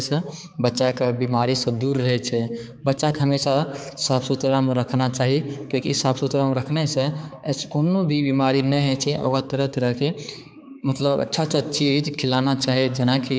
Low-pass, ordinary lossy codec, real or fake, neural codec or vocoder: none; none; fake; codec, 16 kHz, 4 kbps, X-Codec, HuBERT features, trained on balanced general audio